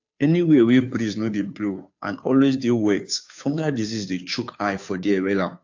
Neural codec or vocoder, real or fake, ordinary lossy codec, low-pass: codec, 16 kHz, 2 kbps, FunCodec, trained on Chinese and English, 25 frames a second; fake; AAC, 48 kbps; 7.2 kHz